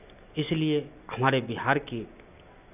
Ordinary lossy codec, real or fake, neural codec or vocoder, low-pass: none; real; none; 3.6 kHz